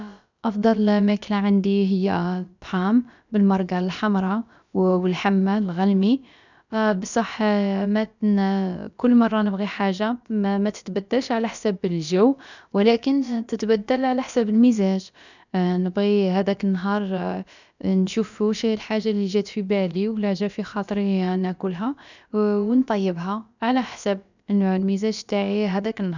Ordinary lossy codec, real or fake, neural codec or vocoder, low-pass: none; fake; codec, 16 kHz, about 1 kbps, DyCAST, with the encoder's durations; 7.2 kHz